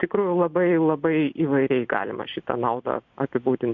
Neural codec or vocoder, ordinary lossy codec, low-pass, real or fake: none; MP3, 64 kbps; 7.2 kHz; real